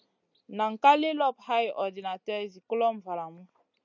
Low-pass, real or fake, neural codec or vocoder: 7.2 kHz; real; none